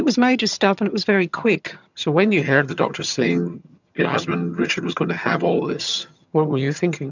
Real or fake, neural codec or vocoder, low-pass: fake; vocoder, 22.05 kHz, 80 mel bands, HiFi-GAN; 7.2 kHz